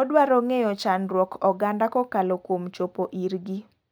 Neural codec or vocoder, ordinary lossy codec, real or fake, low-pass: none; none; real; none